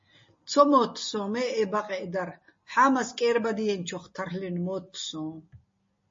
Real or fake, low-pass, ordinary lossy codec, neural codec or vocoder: real; 7.2 kHz; MP3, 32 kbps; none